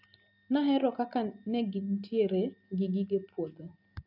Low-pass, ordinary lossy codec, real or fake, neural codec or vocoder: 5.4 kHz; none; real; none